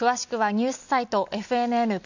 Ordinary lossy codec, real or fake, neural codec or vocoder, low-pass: none; real; none; 7.2 kHz